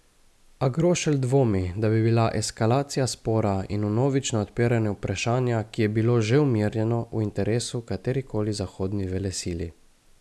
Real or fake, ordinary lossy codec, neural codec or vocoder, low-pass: real; none; none; none